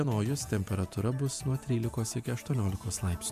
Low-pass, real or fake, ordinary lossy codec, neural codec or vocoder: 14.4 kHz; fake; MP3, 96 kbps; vocoder, 48 kHz, 128 mel bands, Vocos